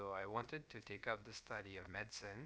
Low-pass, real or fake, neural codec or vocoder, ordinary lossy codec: none; fake; codec, 16 kHz, about 1 kbps, DyCAST, with the encoder's durations; none